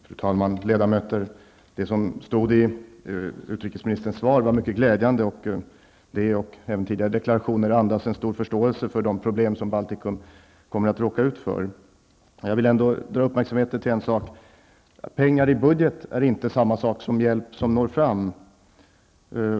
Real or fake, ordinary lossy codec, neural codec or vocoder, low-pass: real; none; none; none